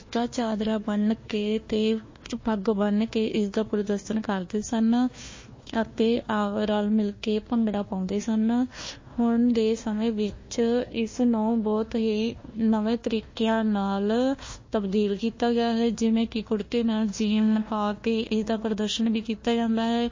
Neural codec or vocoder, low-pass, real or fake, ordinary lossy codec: codec, 16 kHz, 1 kbps, FunCodec, trained on Chinese and English, 50 frames a second; 7.2 kHz; fake; MP3, 32 kbps